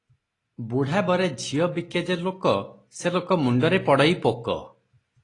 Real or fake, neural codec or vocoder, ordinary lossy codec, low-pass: real; none; AAC, 32 kbps; 10.8 kHz